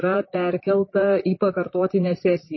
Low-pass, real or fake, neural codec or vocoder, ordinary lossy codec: 7.2 kHz; fake; vocoder, 44.1 kHz, 128 mel bands, Pupu-Vocoder; MP3, 24 kbps